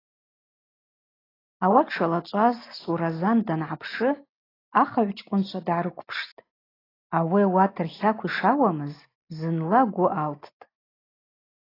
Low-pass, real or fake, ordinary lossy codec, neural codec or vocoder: 5.4 kHz; real; AAC, 24 kbps; none